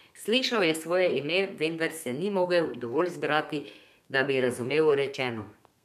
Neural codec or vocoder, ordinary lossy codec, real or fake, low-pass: codec, 32 kHz, 1.9 kbps, SNAC; none; fake; 14.4 kHz